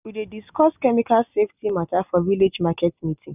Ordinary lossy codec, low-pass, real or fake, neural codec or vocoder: none; 3.6 kHz; real; none